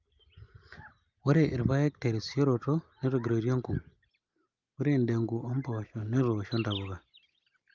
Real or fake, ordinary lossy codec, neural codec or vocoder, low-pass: real; Opus, 32 kbps; none; 7.2 kHz